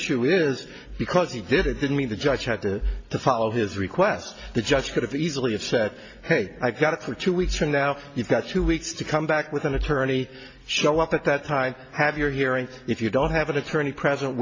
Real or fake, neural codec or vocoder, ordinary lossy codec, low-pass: real; none; MP3, 32 kbps; 7.2 kHz